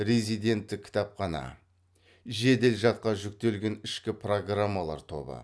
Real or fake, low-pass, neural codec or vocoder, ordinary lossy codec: real; none; none; none